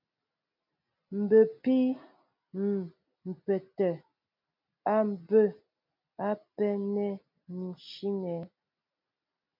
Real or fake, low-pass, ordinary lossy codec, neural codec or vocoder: real; 5.4 kHz; MP3, 48 kbps; none